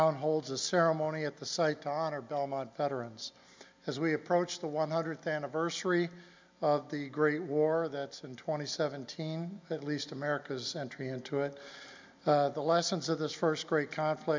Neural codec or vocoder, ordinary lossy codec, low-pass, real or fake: none; MP3, 64 kbps; 7.2 kHz; real